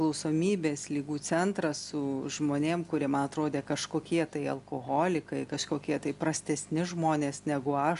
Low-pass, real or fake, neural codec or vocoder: 10.8 kHz; real; none